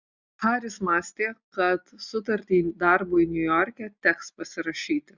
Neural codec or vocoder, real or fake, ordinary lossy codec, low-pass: none; real; Opus, 64 kbps; 7.2 kHz